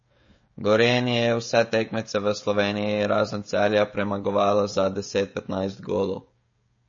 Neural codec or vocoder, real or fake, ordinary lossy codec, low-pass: codec, 16 kHz, 16 kbps, FreqCodec, smaller model; fake; MP3, 32 kbps; 7.2 kHz